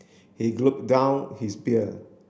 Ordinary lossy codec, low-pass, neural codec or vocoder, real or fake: none; none; none; real